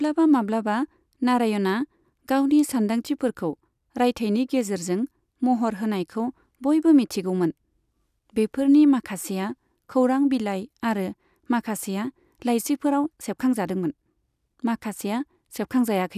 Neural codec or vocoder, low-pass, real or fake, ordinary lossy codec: none; 14.4 kHz; real; none